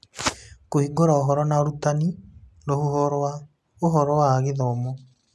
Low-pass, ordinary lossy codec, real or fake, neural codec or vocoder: none; none; real; none